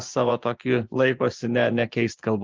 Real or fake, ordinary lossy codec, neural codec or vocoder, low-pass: fake; Opus, 24 kbps; vocoder, 24 kHz, 100 mel bands, Vocos; 7.2 kHz